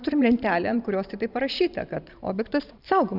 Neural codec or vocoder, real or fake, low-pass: vocoder, 44.1 kHz, 128 mel bands every 512 samples, BigVGAN v2; fake; 5.4 kHz